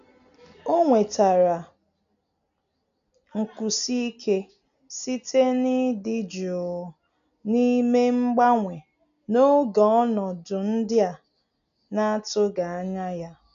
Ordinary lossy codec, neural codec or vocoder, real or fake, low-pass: AAC, 96 kbps; none; real; 7.2 kHz